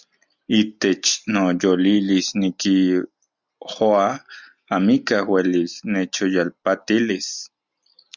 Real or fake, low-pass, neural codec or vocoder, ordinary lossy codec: real; 7.2 kHz; none; Opus, 64 kbps